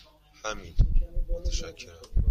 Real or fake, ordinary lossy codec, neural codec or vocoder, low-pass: fake; MP3, 64 kbps; vocoder, 44.1 kHz, 128 mel bands every 256 samples, BigVGAN v2; 14.4 kHz